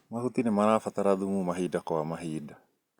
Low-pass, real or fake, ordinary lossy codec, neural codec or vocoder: 19.8 kHz; real; Opus, 64 kbps; none